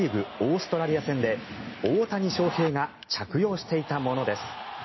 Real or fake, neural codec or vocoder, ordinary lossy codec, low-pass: real; none; MP3, 24 kbps; 7.2 kHz